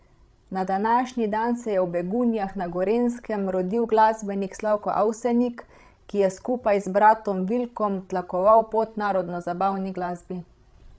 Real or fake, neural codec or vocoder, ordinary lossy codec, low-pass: fake; codec, 16 kHz, 16 kbps, FreqCodec, larger model; none; none